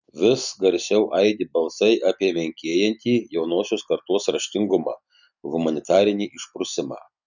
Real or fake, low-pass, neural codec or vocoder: real; 7.2 kHz; none